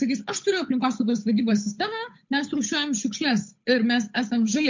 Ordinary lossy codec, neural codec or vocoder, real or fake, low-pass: MP3, 48 kbps; codec, 16 kHz, 8 kbps, FunCodec, trained on Chinese and English, 25 frames a second; fake; 7.2 kHz